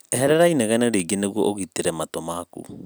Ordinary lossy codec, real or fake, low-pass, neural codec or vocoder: none; real; none; none